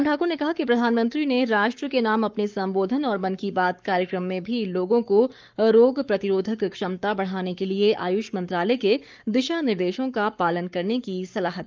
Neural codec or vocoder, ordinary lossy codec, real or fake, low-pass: codec, 44.1 kHz, 7.8 kbps, Pupu-Codec; Opus, 24 kbps; fake; 7.2 kHz